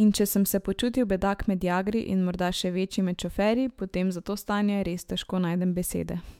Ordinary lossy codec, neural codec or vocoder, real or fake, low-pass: MP3, 96 kbps; autoencoder, 48 kHz, 128 numbers a frame, DAC-VAE, trained on Japanese speech; fake; 19.8 kHz